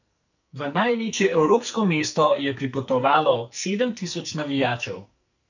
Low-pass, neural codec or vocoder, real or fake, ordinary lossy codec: 7.2 kHz; codec, 44.1 kHz, 2.6 kbps, SNAC; fake; none